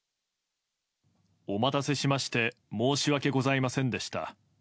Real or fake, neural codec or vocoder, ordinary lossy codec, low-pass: real; none; none; none